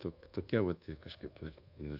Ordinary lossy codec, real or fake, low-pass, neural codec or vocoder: AAC, 48 kbps; fake; 5.4 kHz; codec, 24 kHz, 1.2 kbps, DualCodec